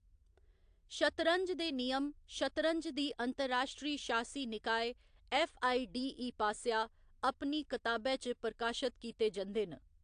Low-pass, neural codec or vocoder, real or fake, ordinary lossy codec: 9.9 kHz; none; real; AAC, 64 kbps